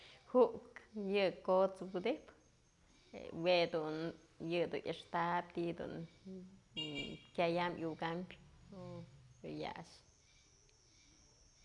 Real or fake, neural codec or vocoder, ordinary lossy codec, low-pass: real; none; none; 10.8 kHz